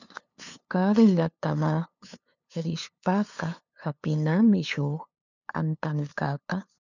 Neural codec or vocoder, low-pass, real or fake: codec, 16 kHz, 2 kbps, FunCodec, trained on LibriTTS, 25 frames a second; 7.2 kHz; fake